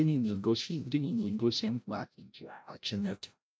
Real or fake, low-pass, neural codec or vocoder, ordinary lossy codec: fake; none; codec, 16 kHz, 0.5 kbps, FreqCodec, larger model; none